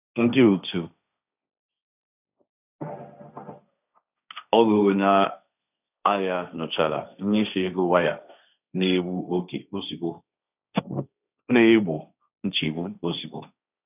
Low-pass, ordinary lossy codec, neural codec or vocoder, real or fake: 3.6 kHz; none; codec, 16 kHz, 1.1 kbps, Voila-Tokenizer; fake